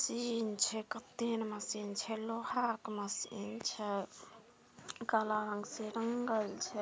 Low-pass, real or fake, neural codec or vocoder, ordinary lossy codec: none; real; none; none